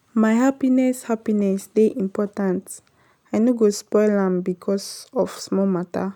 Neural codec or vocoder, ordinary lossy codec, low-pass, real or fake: none; none; 19.8 kHz; real